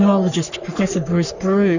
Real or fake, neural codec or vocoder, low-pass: fake; codec, 44.1 kHz, 3.4 kbps, Pupu-Codec; 7.2 kHz